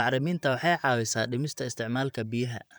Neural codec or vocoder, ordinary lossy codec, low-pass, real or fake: vocoder, 44.1 kHz, 128 mel bands, Pupu-Vocoder; none; none; fake